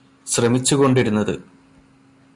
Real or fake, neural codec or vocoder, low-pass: real; none; 10.8 kHz